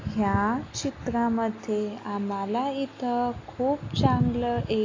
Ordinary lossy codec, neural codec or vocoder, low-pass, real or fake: AAC, 32 kbps; none; 7.2 kHz; real